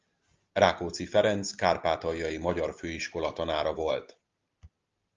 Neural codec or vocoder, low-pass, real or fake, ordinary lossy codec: none; 7.2 kHz; real; Opus, 32 kbps